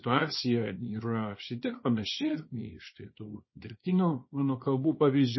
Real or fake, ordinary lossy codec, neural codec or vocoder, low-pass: fake; MP3, 24 kbps; codec, 24 kHz, 0.9 kbps, WavTokenizer, small release; 7.2 kHz